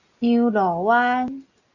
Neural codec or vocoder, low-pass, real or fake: none; 7.2 kHz; real